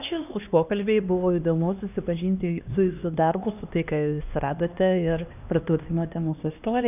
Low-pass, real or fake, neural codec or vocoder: 3.6 kHz; fake; codec, 16 kHz, 2 kbps, X-Codec, HuBERT features, trained on LibriSpeech